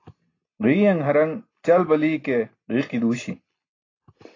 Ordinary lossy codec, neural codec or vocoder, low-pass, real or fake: AAC, 32 kbps; none; 7.2 kHz; real